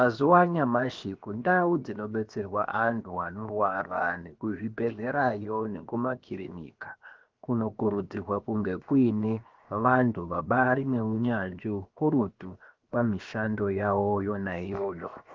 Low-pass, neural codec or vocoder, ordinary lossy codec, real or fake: 7.2 kHz; codec, 16 kHz, 0.7 kbps, FocalCodec; Opus, 32 kbps; fake